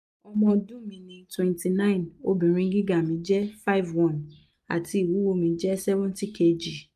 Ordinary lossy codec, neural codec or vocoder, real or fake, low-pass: MP3, 96 kbps; none; real; 14.4 kHz